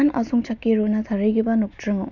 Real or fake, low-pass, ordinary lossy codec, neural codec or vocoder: real; 7.2 kHz; none; none